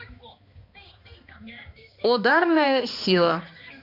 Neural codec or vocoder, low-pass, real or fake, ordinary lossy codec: codec, 16 kHz, 2 kbps, X-Codec, HuBERT features, trained on balanced general audio; 5.4 kHz; fake; none